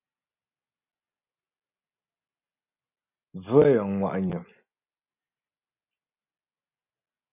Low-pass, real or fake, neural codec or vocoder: 3.6 kHz; real; none